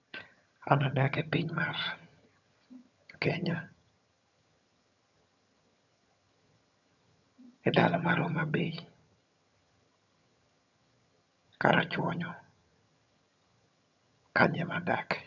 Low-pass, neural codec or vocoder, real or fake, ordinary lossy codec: 7.2 kHz; vocoder, 22.05 kHz, 80 mel bands, HiFi-GAN; fake; none